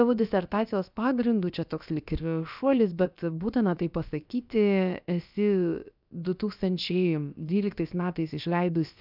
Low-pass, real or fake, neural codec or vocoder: 5.4 kHz; fake; codec, 16 kHz, about 1 kbps, DyCAST, with the encoder's durations